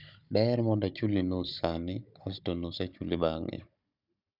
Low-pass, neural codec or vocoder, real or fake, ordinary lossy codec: 5.4 kHz; codec, 16 kHz, 16 kbps, FunCodec, trained on Chinese and English, 50 frames a second; fake; none